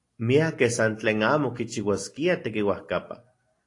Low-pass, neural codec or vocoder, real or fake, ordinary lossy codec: 10.8 kHz; none; real; AAC, 48 kbps